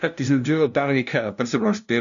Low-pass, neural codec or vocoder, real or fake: 7.2 kHz; codec, 16 kHz, 0.5 kbps, FunCodec, trained on LibriTTS, 25 frames a second; fake